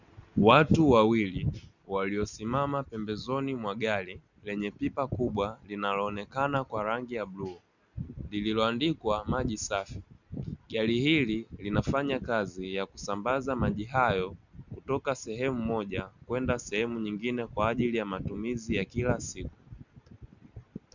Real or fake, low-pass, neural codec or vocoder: real; 7.2 kHz; none